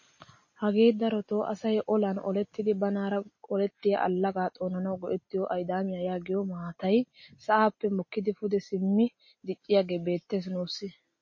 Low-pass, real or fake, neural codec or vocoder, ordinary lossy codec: 7.2 kHz; real; none; MP3, 32 kbps